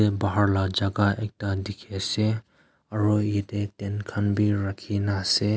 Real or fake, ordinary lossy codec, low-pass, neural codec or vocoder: real; none; none; none